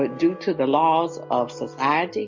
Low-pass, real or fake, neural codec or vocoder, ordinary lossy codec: 7.2 kHz; real; none; MP3, 48 kbps